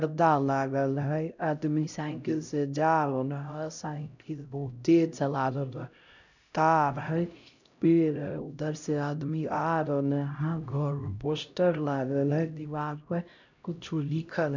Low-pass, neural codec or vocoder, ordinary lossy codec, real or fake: 7.2 kHz; codec, 16 kHz, 0.5 kbps, X-Codec, HuBERT features, trained on LibriSpeech; none; fake